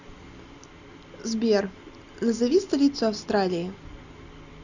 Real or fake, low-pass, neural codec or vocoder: real; 7.2 kHz; none